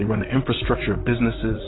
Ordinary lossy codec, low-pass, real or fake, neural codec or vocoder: AAC, 16 kbps; 7.2 kHz; fake; vocoder, 44.1 kHz, 128 mel bands, Pupu-Vocoder